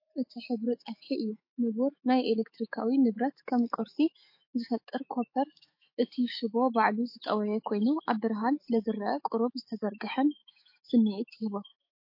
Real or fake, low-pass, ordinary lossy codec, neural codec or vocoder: fake; 5.4 kHz; MP3, 32 kbps; autoencoder, 48 kHz, 128 numbers a frame, DAC-VAE, trained on Japanese speech